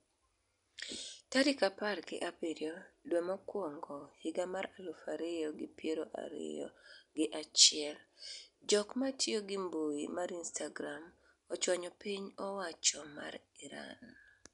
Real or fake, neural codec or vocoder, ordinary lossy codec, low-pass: real; none; none; 10.8 kHz